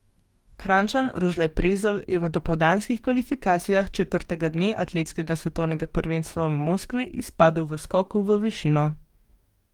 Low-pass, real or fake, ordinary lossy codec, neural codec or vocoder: 19.8 kHz; fake; Opus, 32 kbps; codec, 44.1 kHz, 2.6 kbps, DAC